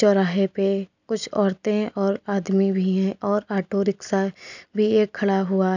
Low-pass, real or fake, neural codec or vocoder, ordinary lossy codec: 7.2 kHz; real; none; none